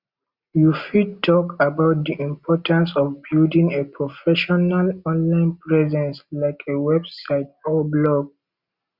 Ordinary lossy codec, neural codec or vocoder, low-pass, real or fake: Opus, 64 kbps; none; 5.4 kHz; real